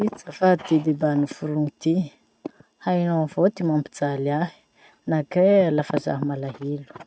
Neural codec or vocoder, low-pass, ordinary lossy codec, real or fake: none; none; none; real